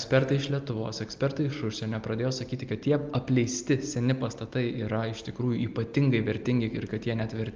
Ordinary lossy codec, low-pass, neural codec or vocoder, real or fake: Opus, 24 kbps; 7.2 kHz; none; real